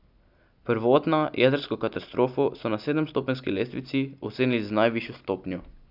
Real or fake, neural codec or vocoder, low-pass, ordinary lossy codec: real; none; 5.4 kHz; none